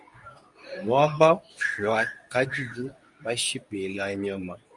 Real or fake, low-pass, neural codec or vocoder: fake; 10.8 kHz; codec, 24 kHz, 0.9 kbps, WavTokenizer, medium speech release version 2